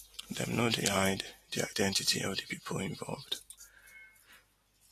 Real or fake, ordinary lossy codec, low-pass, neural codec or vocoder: real; AAC, 48 kbps; 14.4 kHz; none